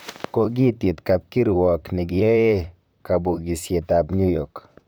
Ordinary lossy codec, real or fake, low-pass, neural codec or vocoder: none; fake; none; vocoder, 44.1 kHz, 128 mel bands, Pupu-Vocoder